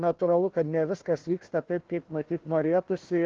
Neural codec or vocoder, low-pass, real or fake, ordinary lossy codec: codec, 16 kHz, 1 kbps, FunCodec, trained on Chinese and English, 50 frames a second; 7.2 kHz; fake; Opus, 16 kbps